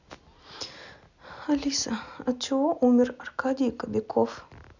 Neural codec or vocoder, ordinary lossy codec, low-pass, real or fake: none; none; 7.2 kHz; real